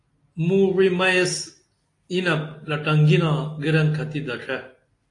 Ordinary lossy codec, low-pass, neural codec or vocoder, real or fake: AAC, 48 kbps; 10.8 kHz; none; real